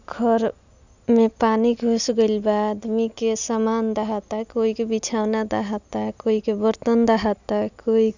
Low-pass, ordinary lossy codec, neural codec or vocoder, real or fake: 7.2 kHz; none; none; real